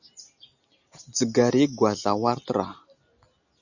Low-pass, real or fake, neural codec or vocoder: 7.2 kHz; real; none